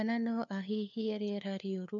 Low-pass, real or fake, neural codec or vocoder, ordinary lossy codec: 7.2 kHz; fake; codec, 16 kHz, 4 kbps, FunCodec, trained on LibriTTS, 50 frames a second; none